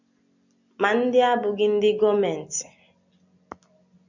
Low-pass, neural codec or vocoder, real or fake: 7.2 kHz; none; real